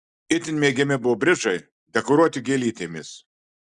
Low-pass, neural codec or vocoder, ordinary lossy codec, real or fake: 10.8 kHz; none; Opus, 64 kbps; real